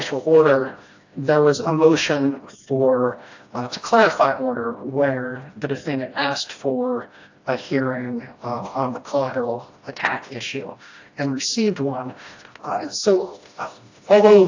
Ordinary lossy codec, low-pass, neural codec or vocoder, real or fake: AAC, 48 kbps; 7.2 kHz; codec, 16 kHz, 1 kbps, FreqCodec, smaller model; fake